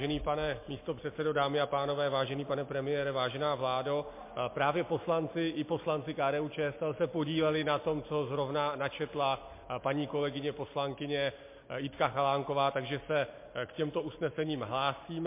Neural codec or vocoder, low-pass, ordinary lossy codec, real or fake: none; 3.6 kHz; MP3, 24 kbps; real